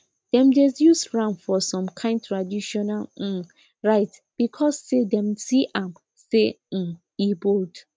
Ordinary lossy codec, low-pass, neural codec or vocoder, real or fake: none; none; none; real